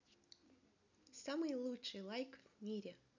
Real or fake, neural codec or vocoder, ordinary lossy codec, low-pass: real; none; none; 7.2 kHz